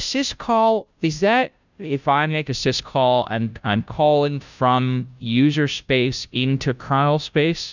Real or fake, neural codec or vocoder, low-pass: fake; codec, 16 kHz, 0.5 kbps, FunCodec, trained on Chinese and English, 25 frames a second; 7.2 kHz